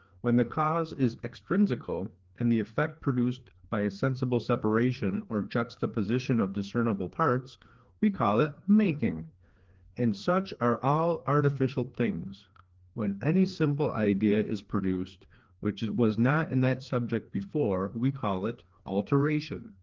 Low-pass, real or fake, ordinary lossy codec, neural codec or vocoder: 7.2 kHz; fake; Opus, 16 kbps; codec, 16 kHz, 2 kbps, FreqCodec, larger model